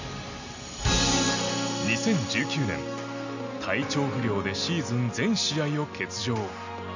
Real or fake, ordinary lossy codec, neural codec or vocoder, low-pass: real; none; none; 7.2 kHz